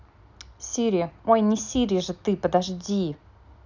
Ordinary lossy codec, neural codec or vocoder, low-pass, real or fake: none; none; 7.2 kHz; real